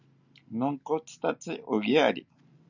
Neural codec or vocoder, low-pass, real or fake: none; 7.2 kHz; real